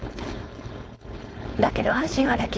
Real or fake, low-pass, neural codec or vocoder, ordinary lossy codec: fake; none; codec, 16 kHz, 4.8 kbps, FACodec; none